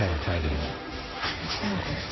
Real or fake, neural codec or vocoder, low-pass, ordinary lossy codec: fake; codec, 16 kHz, 1.1 kbps, Voila-Tokenizer; 7.2 kHz; MP3, 24 kbps